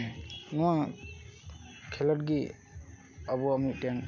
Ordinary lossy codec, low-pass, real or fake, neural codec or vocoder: none; 7.2 kHz; real; none